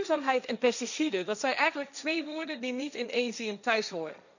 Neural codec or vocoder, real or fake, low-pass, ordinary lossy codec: codec, 16 kHz, 1.1 kbps, Voila-Tokenizer; fake; 7.2 kHz; none